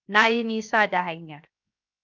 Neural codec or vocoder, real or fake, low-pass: codec, 16 kHz, 0.7 kbps, FocalCodec; fake; 7.2 kHz